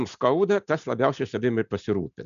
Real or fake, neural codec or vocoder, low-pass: real; none; 7.2 kHz